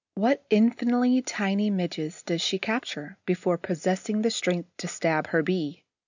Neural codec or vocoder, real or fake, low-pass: none; real; 7.2 kHz